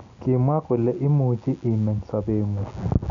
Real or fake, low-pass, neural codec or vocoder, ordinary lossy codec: real; 7.2 kHz; none; none